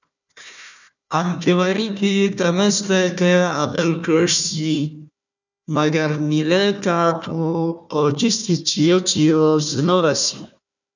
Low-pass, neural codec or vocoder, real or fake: 7.2 kHz; codec, 16 kHz, 1 kbps, FunCodec, trained on Chinese and English, 50 frames a second; fake